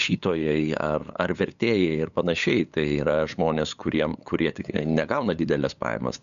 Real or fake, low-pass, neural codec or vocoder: fake; 7.2 kHz; codec, 16 kHz, 8 kbps, FunCodec, trained on LibriTTS, 25 frames a second